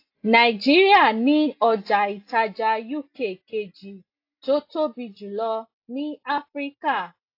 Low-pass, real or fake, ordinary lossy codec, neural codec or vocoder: 5.4 kHz; real; AAC, 32 kbps; none